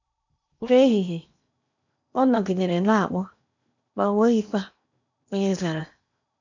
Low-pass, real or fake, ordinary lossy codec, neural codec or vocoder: 7.2 kHz; fake; none; codec, 16 kHz in and 24 kHz out, 0.8 kbps, FocalCodec, streaming, 65536 codes